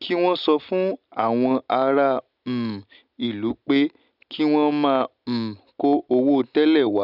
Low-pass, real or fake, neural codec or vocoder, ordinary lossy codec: 5.4 kHz; real; none; none